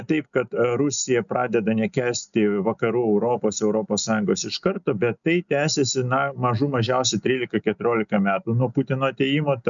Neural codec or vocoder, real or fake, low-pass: none; real; 7.2 kHz